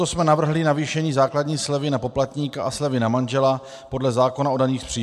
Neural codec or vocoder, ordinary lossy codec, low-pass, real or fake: none; MP3, 96 kbps; 14.4 kHz; real